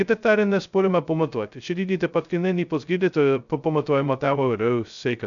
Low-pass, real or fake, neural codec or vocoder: 7.2 kHz; fake; codec, 16 kHz, 0.2 kbps, FocalCodec